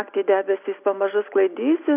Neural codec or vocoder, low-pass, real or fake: none; 3.6 kHz; real